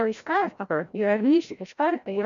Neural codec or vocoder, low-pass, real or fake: codec, 16 kHz, 0.5 kbps, FreqCodec, larger model; 7.2 kHz; fake